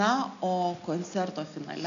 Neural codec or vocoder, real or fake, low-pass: none; real; 7.2 kHz